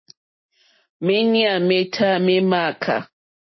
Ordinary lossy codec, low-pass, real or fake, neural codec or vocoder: MP3, 24 kbps; 7.2 kHz; real; none